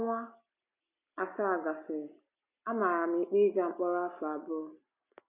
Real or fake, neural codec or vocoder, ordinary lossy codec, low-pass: real; none; none; 3.6 kHz